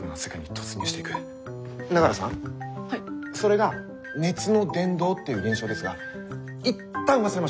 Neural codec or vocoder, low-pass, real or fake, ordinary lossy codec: none; none; real; none